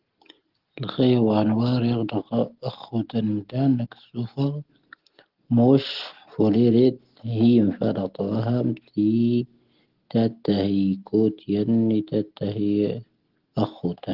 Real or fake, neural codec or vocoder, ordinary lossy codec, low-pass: real; none; Opus, 16 kbps; 5.4 kHz